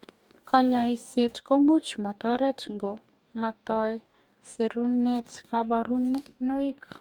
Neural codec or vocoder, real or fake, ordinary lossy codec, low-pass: codec, 44.1 kHz, 2.6 kbps, DAC; fake; Opus, 64 kbps; 19.8 kHz